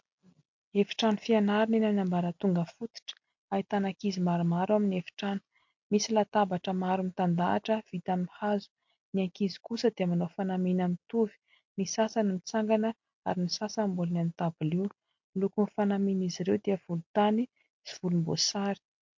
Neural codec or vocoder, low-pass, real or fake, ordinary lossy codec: none; 7.2 kHz; real; MP3, 48 kbps